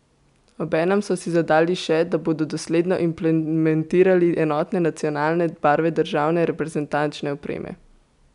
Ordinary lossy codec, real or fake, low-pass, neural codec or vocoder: none; real; 10.8 kHz; none